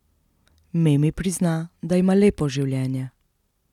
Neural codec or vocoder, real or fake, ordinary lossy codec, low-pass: none; real; none; 19.8 kHz